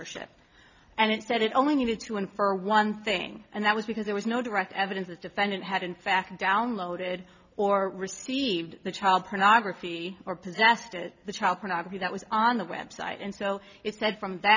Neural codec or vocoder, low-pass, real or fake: none; 7.2 kHz; real